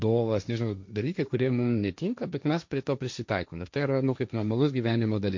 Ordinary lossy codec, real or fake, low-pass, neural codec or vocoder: MP3, 48 kbps; fake; 7.2 kHz; codec, 16 kHz, 1.1 kbps, Voila-Tokenizer